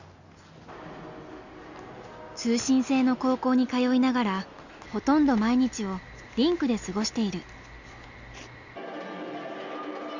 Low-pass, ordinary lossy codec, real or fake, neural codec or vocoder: 7.2 kHz; Opus, 64 kbps; real; none